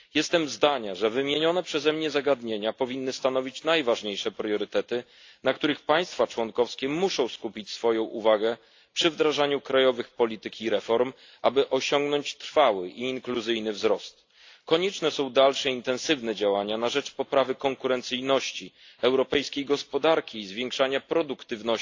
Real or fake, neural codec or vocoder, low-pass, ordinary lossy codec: real; none; 7.2 kHz; AAC, 48 kbps